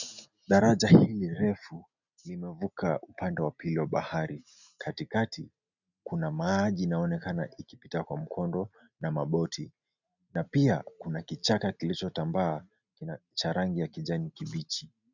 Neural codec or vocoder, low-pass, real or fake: none; 7.2 kHz; real